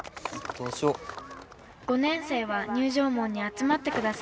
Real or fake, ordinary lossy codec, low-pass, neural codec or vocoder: real; none; none; none